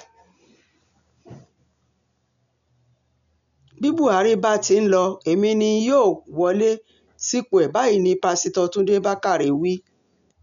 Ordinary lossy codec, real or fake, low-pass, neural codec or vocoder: none; real; 7.2 kHz; none